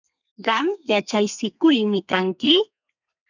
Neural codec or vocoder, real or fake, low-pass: codec, 32 kHz, 1.9 kbps, SNAC; fake; 7.2 kHz